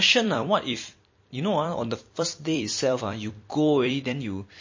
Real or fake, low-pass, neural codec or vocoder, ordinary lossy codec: fake; 7.2 kHz; vocoder, 44.1 kHz, 128 mel bands every 256 samples, BigVGAN v2; MP3, 32 kbps